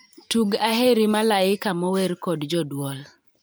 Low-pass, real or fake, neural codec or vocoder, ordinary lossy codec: none; fake; vocoder, 44.1 kHz, 128 mel bands every 512 samples, BigVGAN v2; none